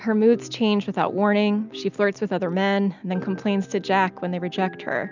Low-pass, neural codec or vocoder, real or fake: 7.2 kHz; none; real